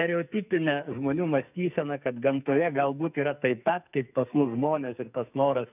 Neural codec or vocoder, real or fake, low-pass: codec, 44.1 kHz, 2.6 kbps, SNAC; fake; 3.6 kHz